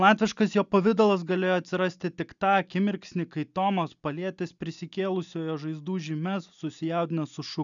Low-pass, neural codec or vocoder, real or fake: 7.2 kHz; none; real